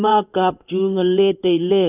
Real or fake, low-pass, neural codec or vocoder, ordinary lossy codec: fake; 3.6 kHz; codec, 16 kHz in and 24 kHz out, 1 kbps, XY-Tokenizer; none